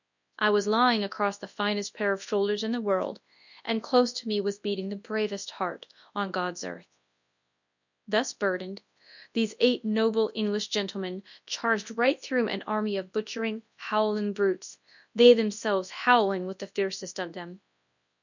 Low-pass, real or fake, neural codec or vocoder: 7.2 kHz; fake; codec, 24 kHz, 0.9 kbps, WavTokenizer, large speech release